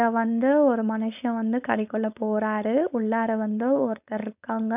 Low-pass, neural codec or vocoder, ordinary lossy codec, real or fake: 3.6 kHz; codec, 16 kHz, 4.8 kbps, FACodec; none; fake